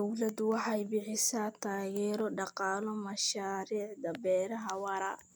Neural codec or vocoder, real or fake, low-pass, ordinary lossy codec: none; real; none; none